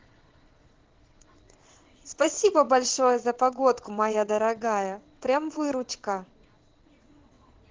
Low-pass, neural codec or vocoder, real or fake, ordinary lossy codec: 7.2 kHz; vocoder, 22.05 kHz, 80 mel bands, WaveNeXt; fake; Opus, 16 kbps